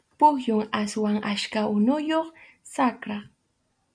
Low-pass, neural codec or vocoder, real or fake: 9.9 kHz; none; real